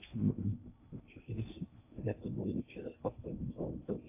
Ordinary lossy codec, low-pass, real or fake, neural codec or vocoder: AAC, 24 kbps; 3.6 kHz; fake; codec, 16 kHz in and 24 kHz out, 0.6 kbps, FocalCodec, streaming, 2048 codes